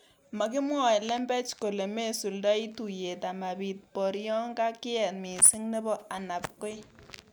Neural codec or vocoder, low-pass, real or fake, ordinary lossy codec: none; none; real; none